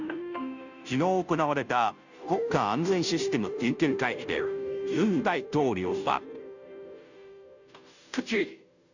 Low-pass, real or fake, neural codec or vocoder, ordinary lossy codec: 7.2 kHz; fake; codec, 16 kHz, 0.5 kbps, FunCodec, trained on Chinese and English, 25 frames a second; none